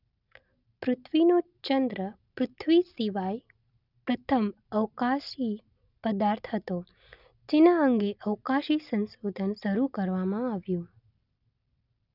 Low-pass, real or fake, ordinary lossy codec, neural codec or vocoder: 5.4 kHz; real; none; none